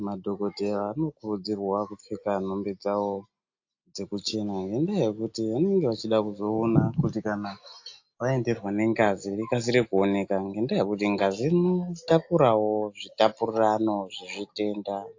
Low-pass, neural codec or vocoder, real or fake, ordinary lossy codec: 7.2 kHz; none; real; AAC, 48 kbps